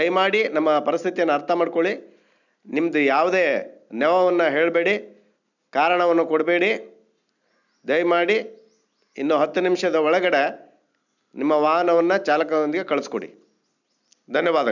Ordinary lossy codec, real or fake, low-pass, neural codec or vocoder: none; real; 7.2 kHz; none